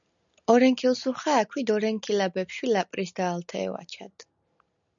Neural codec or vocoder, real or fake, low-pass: none; real; 7.2 kHz